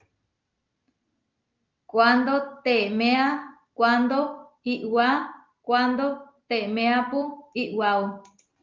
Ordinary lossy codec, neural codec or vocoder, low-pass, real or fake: Opus, 24 kbps; none; 7.2 kHz; real